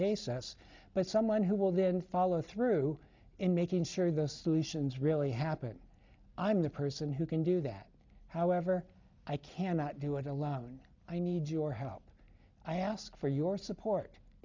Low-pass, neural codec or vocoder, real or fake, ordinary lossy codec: 7.2 kHz; none; real; MP3, 64 kbps